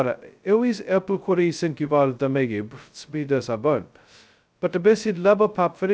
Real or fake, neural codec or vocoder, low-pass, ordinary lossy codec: fake; codec, 16 kHz, 0.2 kbps, FocalCodec; none; none